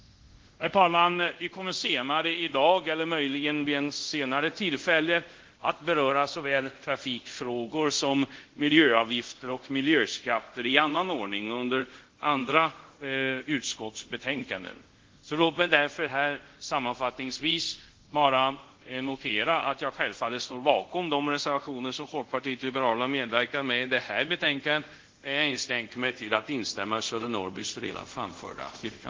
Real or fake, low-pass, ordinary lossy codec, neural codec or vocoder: fake; 7.2 kHz; Opus, 16 kbps; codec, 24 kHz, 0.5 kbps, DualCodec